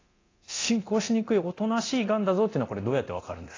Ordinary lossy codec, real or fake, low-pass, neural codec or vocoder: AAC, 32 kbps; fake; 7.2 kHz; codec, 24 kHz, 0.9 kbps, DualCodec